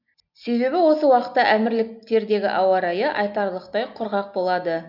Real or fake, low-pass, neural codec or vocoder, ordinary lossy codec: real; 5.4 kHz; none; none